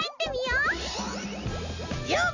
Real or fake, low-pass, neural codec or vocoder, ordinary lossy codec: real; 7.2 kHz; none; none